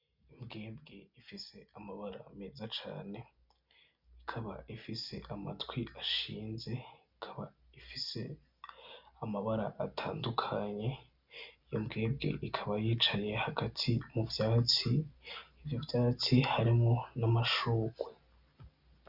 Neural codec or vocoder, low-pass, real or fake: none; 5.4 kHz; real